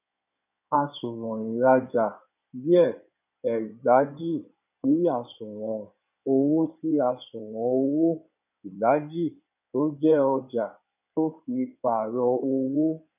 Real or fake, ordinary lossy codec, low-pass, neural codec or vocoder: fake; none; 3.6 kHz; codec, 16 kHz in and 24 kHz out, 2.2 kbps, FireRedTTS-2 codec